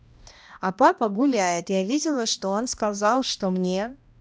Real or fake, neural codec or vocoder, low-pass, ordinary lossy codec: fake; codec, 16 kHz, 1 kbps, X-Codec, HuBERT features, trained on balanced general audio; none; none